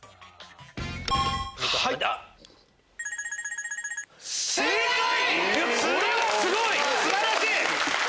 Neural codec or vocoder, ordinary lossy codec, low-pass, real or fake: none; none; none; real